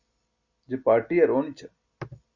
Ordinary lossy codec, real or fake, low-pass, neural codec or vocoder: Opus, 64 kbps; real; 7.2 kHz; none